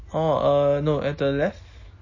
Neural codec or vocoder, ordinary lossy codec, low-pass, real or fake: none; MP3, 32 kbps; 7.2 kHz; real